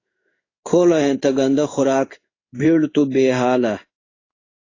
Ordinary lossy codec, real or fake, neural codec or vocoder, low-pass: AAC, 32 kbps; fake; codec, 16 kHz in and 24 kHz out, 1 kbps, XY-Tokenizer; 7.2 kHz